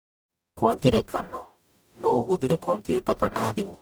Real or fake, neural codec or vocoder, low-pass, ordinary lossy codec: fake; codec, 44.1 kHz, 0.9 kbps, DAC; none; none